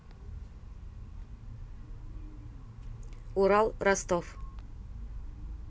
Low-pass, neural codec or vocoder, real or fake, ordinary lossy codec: none; none; real; none